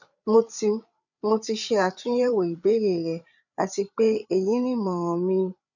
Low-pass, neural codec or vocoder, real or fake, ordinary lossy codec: 7.2 kHz; codec, 16 kHz, 8 kbps, FreqCodec, larger model; fake; none